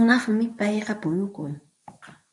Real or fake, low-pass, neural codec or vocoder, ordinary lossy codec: fake; 10.8 kHz; codec, 24 kHz, 0.9 kbps, WavTokenizer, medium speech release version 2; MP3, 64 kbps